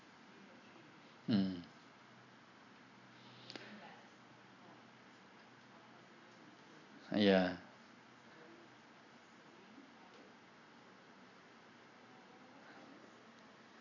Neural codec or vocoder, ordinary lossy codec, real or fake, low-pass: none; none; real; 7.2 kHz